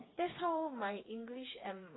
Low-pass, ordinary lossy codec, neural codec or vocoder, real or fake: 7.2 kHz; AAC, 16 kbps; codec, 16 kHz, 4 kbps, FreqCodec, smaller model; fake